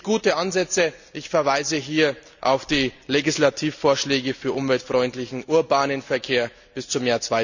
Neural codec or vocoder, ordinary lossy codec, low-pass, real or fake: none; none; 7.2 kHz; real